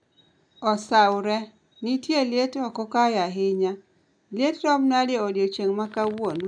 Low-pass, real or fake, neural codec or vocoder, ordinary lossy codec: 9.9 kHz; real; none; none